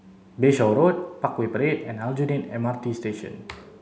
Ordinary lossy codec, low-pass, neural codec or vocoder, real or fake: none; none; none; real